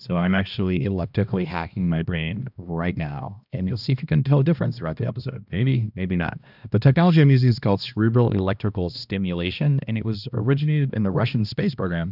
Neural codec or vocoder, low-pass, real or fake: codec, 16 kHz, 1 kbps, X-Codec, HuBERT features, trained on balanced general audio; 5.4 kHz; fake